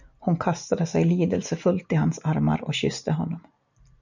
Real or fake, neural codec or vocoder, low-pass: real; none; 7.2 kHz